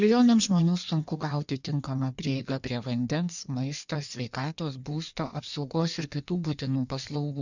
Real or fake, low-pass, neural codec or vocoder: fake; 7.2 kHz; codec, 16 kHz in and 24 kHz out, 1.1 kbps, FireRedTTS-2 codec